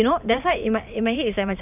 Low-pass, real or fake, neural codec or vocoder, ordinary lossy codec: 3.6 kHz; fake; vocoder, 44.1 kHz, 128 mel bands every 512 samples, BigVGAN v2; none